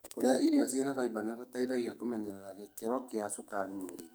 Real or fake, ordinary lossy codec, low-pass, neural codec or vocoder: fake; none; none; codec, 44.1 kHz, 2.6 kbps, SNAC